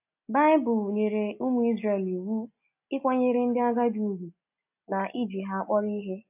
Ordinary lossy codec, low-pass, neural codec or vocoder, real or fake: AAC, 32 kbps; 3.6 kHz; none; real